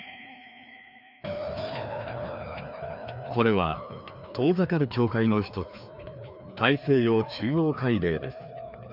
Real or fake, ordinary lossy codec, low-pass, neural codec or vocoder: fake; none; 5.4 kHz; codec, 16 kHz, 2 kbps, FreqCodec, larger model